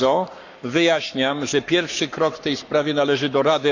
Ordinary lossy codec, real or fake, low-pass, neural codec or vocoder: none; fake; 7.2 kHz; codec, 44.1 kHz, 7.8 kbps, Pupu-Codec